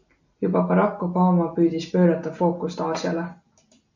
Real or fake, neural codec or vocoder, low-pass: real; none; 7.2 kHz